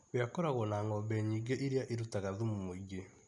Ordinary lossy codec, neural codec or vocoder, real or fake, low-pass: none; none; real; 10.8 kHz